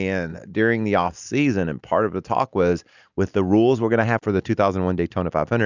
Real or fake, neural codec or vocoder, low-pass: real; none; 7.2 kHz